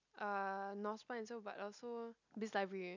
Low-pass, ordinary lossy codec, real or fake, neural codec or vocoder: 7.2 kHz; none; real; none